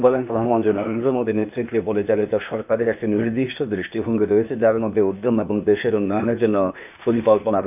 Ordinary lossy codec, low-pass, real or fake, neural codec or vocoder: none; 3.6 kHz; fake; codec, 16 kHz, 0.8 kbps, ZipCodec